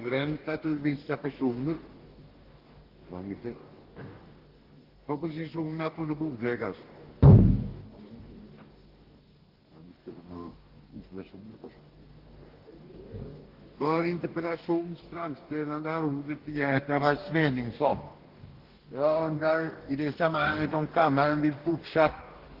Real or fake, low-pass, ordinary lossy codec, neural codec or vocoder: fake; 5.4 kHz; Opus, 16 kbps; codec, 44.1 kHz, 2.6 kbps, DAC